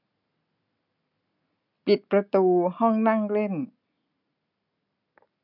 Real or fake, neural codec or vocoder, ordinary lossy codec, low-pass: real; none; none; 5.4 kHz